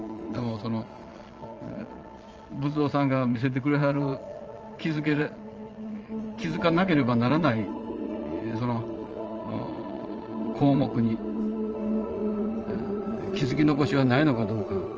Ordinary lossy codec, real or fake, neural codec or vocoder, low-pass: Opus, 24 kbps; fake; vocoder, 22.05 kHz, 80 mel bands, Vocos; 7.2 kHz